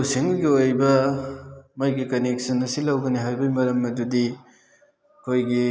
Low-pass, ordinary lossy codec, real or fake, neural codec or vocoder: none; none; real; none